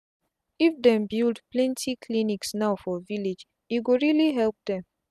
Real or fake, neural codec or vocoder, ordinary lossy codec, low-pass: real; none; none; 14.4 kHz